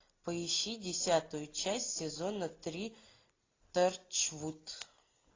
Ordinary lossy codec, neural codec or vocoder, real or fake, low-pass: AAC, 32 kbps; none; real; 7.2 kHz